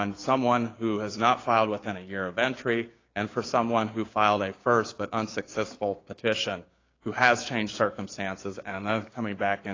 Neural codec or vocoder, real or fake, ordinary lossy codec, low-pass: codec, 16 kHz, 6 kbps, DAC; fake; AAC, 32 kbps; 7.2 kHz